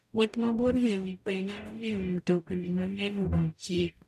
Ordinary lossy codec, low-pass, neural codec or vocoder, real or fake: none; 14.4 kHz; codec, 44.1 kHz, 0.9 kbps, DAC; fake